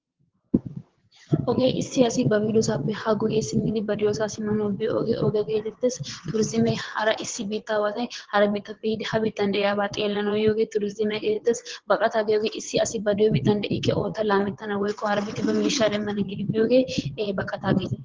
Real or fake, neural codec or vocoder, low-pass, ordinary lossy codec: fake; vocoder, 22.05 kHz, 80 mel bands, WaveNeXt; 7.2 kHz; Opus, 16 kbps